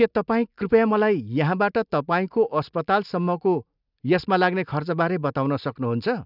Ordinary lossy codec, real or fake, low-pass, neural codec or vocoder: none; real; 5.4 kHz; none